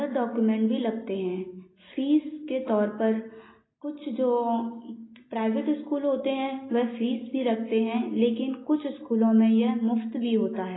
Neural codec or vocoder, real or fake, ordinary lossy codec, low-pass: none; real; AAC, 16 kbps; 7.2 kHz